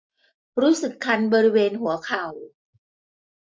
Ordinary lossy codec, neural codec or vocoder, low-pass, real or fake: none; none; none; real